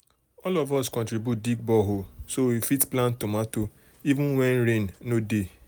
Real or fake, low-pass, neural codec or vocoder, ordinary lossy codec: real; none; none; none